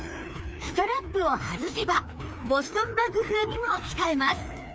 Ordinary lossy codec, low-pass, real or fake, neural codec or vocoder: none; none; fake; codec, 16 kHz, 2 kbps, FreqCodec, larger model